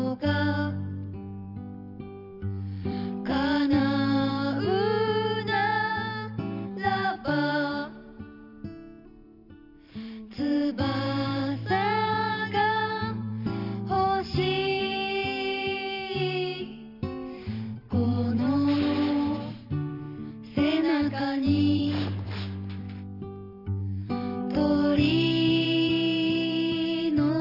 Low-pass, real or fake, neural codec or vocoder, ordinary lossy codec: 5.4 kHz; fake; vocoder, 44.1 kHz, 128 mel bands every 512 samples, BigVGAN v2; none